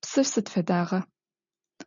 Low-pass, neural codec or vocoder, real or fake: 7.2 kHz; none; real